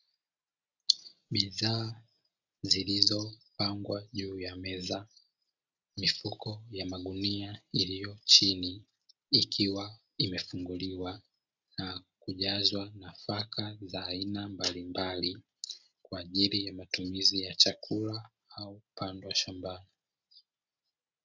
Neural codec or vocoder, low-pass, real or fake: none; 7.2 kHz; real